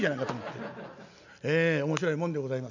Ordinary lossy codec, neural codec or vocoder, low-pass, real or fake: none; none; 7.2 kHz; real